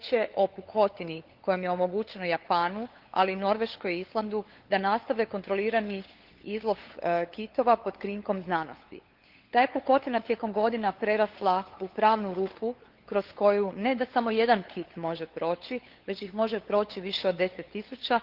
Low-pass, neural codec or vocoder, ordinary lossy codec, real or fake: 5.4 kHz; codec, 16 kHz, 8 kbps, FunCodec, trained on LibriTTS, 25 frames a second; Opus, 16 kbps; fake